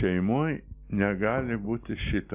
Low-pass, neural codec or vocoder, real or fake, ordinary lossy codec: 3.6 kHz; none; real; Opus, 64 kbps